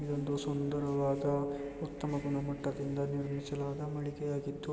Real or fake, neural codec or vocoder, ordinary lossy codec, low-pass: real; none; none; none